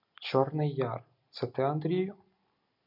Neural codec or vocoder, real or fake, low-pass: none; real; 5.4 kHz